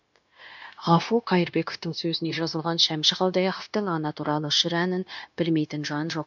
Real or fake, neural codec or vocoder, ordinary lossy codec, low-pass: fake; codec, 16 kHz, 0.9 kbps, LongCat-Audio-Codec; MP3, 64 kbps; 7.2 kHz